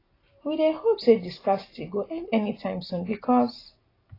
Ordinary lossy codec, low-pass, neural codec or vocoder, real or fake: AAC, 24 kbps; 5.4 kHz; none; real